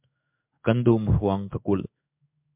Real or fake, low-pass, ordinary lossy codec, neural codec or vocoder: fake; 3.6 kHz; MP3, 32 kbps; codec, 16 kHz in and 24 kHz out, 1 kbps, XY-Tokenizer